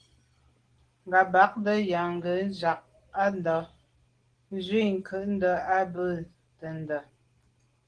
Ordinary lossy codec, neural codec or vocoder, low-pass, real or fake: Opus, 16 kbps; none; 9.9 kHz; real